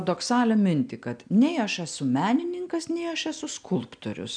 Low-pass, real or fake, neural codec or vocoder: 9.9 kHz; real; none